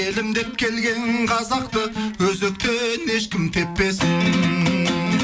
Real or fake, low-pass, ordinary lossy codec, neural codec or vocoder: real; none; none; none